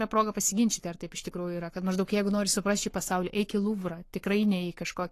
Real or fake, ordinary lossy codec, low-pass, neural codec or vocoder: fake; AAC, 48 kbps; 14.4 kHz; codec, 44.1 kHz, 7.8 kbps, Pupu-Codec